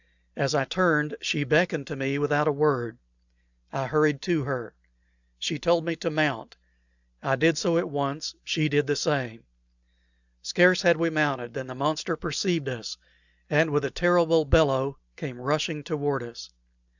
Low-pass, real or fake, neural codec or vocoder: 7.2 kHz; real; none